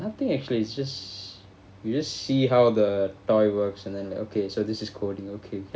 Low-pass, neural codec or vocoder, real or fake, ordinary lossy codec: none; none; real; none